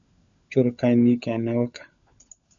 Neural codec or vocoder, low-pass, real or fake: codec, 16 kHz, 16 kbps, FunCodec, trained on LibriTTS, 50 frames a second; 7.2 kHz; fake